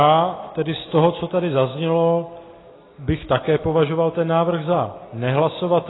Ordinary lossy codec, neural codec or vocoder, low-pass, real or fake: AAC, 16 kbps; none; 7.2 kHz; real